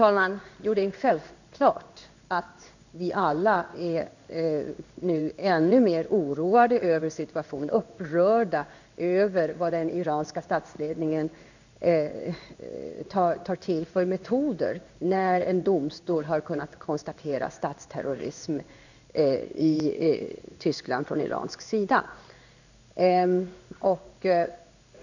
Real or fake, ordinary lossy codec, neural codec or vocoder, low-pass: fake; none; codec, 16 kHz in and 24 kHz out, 1 kbps, XY-Tokenizer; 7.2 kHz